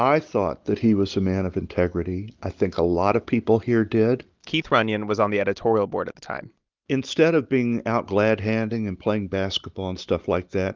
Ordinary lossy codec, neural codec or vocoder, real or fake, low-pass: Opus, 24 kbps; none; real; 7.2 kHz